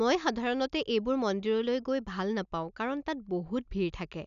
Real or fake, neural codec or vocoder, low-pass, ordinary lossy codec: real; none; 7.2 kHz; none